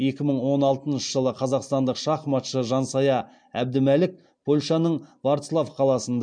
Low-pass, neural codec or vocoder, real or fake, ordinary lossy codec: 9.9 kHz; none; real; MP3, 64 kbps